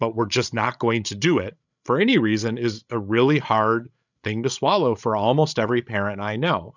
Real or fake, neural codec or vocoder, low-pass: fake; codec, 16 kHz, 16 kbps, FreqCodec, larger model; 7.2 kHz